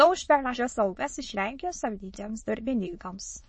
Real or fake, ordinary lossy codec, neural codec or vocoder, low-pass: fake; MP3, 32 kbps; autoencoder, 22.05 kHz, a latent of 192 numbers a frame, VITS, trained on many speakers; 9.9 kHz